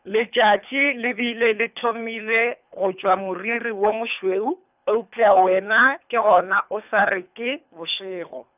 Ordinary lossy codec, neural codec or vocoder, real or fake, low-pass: none; codec, 24 kHz, 3 kbps, HILCodec; fake; 3.6 kHz